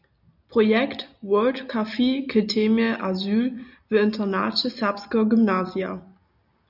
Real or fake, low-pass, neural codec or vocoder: real; 5.4 kHz; none